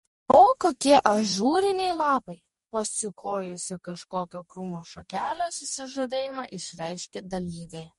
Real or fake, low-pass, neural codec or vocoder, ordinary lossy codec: fake; 19.8 kHz; codec, 44.1 kHz, 2.6 kbps, DAC; MP3, 48 kbps